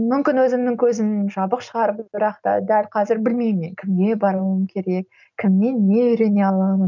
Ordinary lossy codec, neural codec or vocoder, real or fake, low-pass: none; none; real; 7.2 kHz